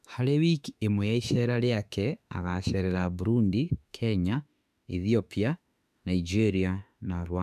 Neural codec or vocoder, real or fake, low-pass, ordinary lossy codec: autoencoder, 48 kHz, 32 numbers a frame, DAC-VAE, trained on Japanese speech; fake; 14.4 kHz; none